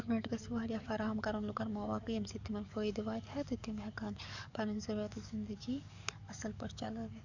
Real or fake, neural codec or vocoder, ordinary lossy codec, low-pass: fake; codec, 44.1 kHz, 7.8 kbps, DAC; none; 7.2 kHz